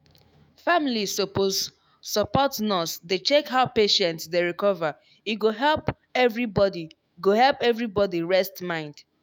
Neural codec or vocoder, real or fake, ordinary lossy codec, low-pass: autoencoder, 48 kHz, 128 numbers a frame, DAC-VAE, trained on Japanese speech; fake; none; none